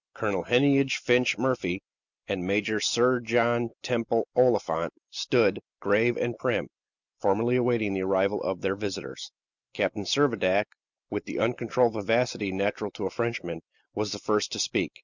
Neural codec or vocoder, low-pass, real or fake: none; 7.2 kHz; real